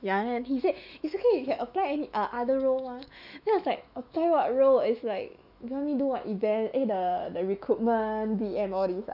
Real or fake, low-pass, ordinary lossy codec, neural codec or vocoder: fake; 5.4 kHz; none; autoencoder, 48 kHz, 128 numbers a frame, DAC-VAE, trained on Japanese speech